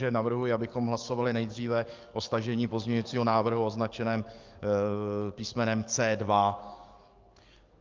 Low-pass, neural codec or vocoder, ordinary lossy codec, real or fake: 7.2 kHz; codec, 44.1 kHz, 7.8 kbps, DAC; Opus, 24 kbps; fake